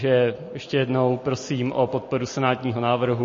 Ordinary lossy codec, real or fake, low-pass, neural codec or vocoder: MP3, 32 kbps; real; 7.2 kHz; none